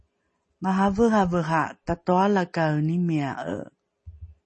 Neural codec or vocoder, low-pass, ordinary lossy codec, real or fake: none; 10.8 kHz; MP3, 32 kbps; real